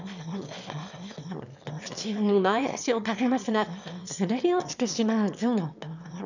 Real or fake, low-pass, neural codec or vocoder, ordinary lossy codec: fake; 7.2 kHz; autoencoder, 22.05 kHz, a latent of 192 numbers a frame, VITS, trained on one speaker; none